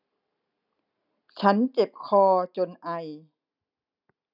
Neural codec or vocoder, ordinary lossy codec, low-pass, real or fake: none; none; 5.4 kHz; real